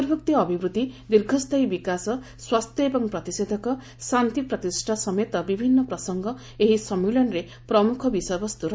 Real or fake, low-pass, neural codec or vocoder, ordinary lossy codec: real; none; none; none